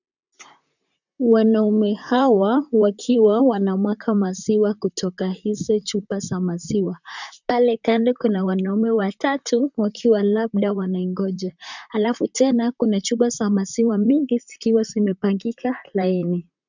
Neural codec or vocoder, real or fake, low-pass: vocoder, 44.1 kHz, 128 mel bands, Pupu-Vocoder; fake; 7.2 kHz